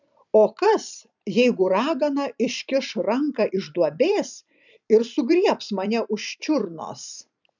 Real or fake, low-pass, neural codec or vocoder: fake; 7.2 kHz; vocoder, 44.1 kHz, 128 mel bands every 256 samples, BigVGAN v2